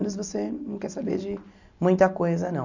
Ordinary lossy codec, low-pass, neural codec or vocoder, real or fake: none; 7.2 kHz; none; real